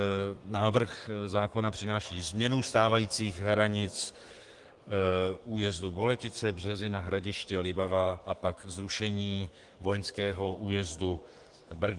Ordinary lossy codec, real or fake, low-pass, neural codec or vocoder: Opus, 24 kbps; fake; 10.8 kHz; codec, 32 kHz, 1.9 kbps, SNAC